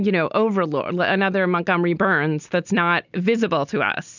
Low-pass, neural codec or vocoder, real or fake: 7.2 kHz; none; real